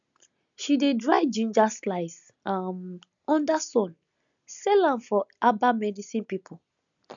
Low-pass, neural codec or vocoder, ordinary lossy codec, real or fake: 7.2 kHz; none; none; real